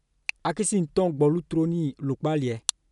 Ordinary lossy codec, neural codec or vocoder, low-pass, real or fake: none; none; 10.8 kHz; real